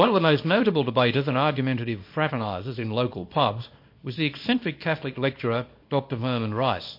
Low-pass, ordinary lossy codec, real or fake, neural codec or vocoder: 5.4 kHz; MP3, 32 kbps; fake; codec, 24 kHz, 0.9 kbps, WavTokenizer, small release